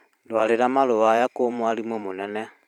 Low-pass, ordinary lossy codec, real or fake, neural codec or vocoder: 19.8 kHz; none; fake; vocoder, 44.1 kHz, 128 mel bands every 512 samples, BigVGAN v2